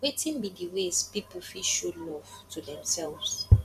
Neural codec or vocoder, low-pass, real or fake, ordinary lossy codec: vocoder, 44.1 kHz, 128 mel bands every 512 samples, BigVGAN v2; 14.4 kHz; fake; none